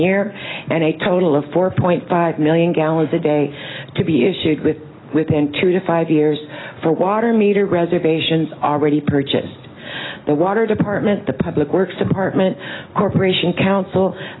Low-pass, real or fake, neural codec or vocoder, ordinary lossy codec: 7.2 kHz; real; none; AAC, 16 kbps